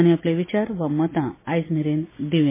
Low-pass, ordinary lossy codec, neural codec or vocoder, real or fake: 3.6 kHz; MP3, 16 kbps; none; real